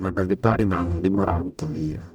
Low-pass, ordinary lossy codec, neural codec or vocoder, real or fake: 19.8 kHz; none; codec, 44.1 kHz, 0.9 kbps, DAC; fake